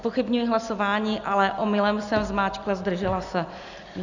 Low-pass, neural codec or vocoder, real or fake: 7.2 kHz; none; real